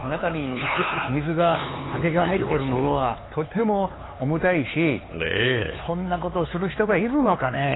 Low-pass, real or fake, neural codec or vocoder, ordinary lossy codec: 7.2 kHz; fake; codec, 16 kHz, 4 kbps, X-Codec, HuBERT features, trained on LibriSpeech; AAC, 16 kbps